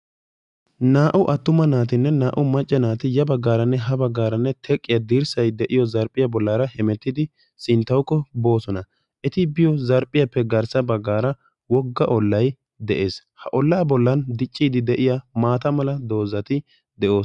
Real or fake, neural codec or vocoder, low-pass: real; none; 10.8 kHz